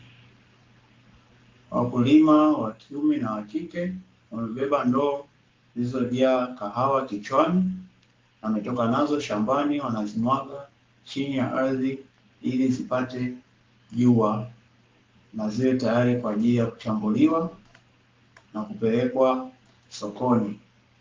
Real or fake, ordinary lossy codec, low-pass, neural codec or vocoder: fake; Opus, 32 kbps; 7.2 kHz; codec, 44.1 kHz, 7.8 kbps, Pupu-Codec